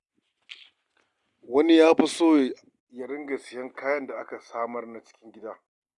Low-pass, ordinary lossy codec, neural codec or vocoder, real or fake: 10.8 kHz; Opus, 64 kbps; none; real